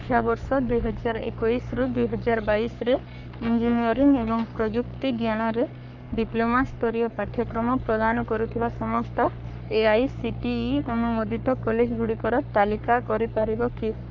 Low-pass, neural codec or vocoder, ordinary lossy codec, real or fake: 7.2 kHz; codec, 44.1 kHz, 3.4 kbps, Pupu-Codec; none; fake